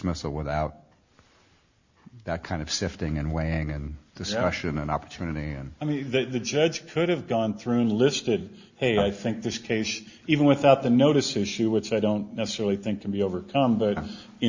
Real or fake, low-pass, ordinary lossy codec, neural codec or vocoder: real; 7.2 kHz; Opus, 64 kbps; none